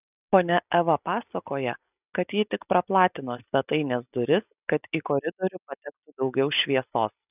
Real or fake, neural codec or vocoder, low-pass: real; none; 3.6 kHz